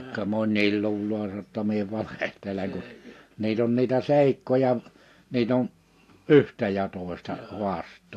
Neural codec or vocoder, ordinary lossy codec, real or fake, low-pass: none; AAC, 64 kbps; real; 14.4 kHz